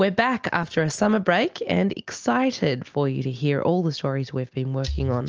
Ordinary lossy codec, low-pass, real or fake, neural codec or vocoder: Opus, 24 kbps; 7.2 kHz; real; none